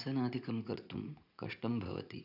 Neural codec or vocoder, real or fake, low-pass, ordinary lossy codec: vocoder, 44.1 kHz, 80 mel bands, Vocos; fake; 5.4 kHz; none